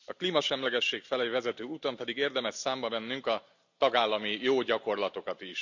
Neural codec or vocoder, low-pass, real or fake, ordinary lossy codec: none; 7.2 kHz; real; none